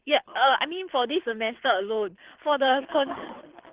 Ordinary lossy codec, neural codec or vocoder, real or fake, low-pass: Opus, 16 kbps; codec, 24 kHz, 6 kbps, HILCodec; fake; 3.6 kHz